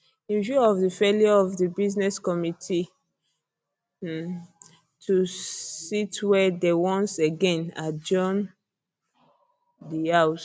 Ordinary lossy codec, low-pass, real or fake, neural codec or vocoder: none; none; real; none